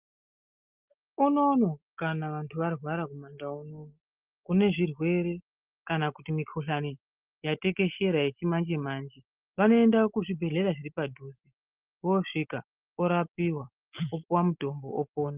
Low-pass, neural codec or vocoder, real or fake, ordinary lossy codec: 3.6 kHz; none; real; Opus, 32 kbps